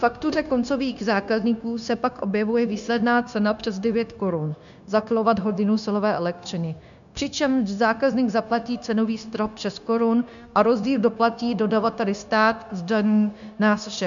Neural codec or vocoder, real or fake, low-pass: codec, 16 kHz, 0.9 kbps, LongCat-Audio-Codec; fake; 7.2 kHz